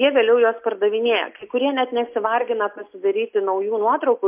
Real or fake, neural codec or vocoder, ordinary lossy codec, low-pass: real; none; MP3, 32 kbps; 3.6 kHz